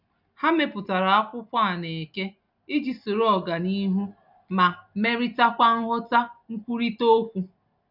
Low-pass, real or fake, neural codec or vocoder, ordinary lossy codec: 5.4 kHz; real; none; none